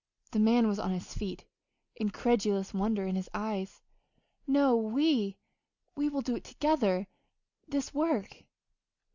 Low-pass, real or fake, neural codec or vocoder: 7.2 kHz; real; none